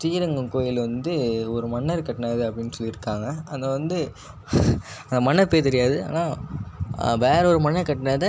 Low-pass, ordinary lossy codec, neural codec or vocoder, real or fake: none; none; none; real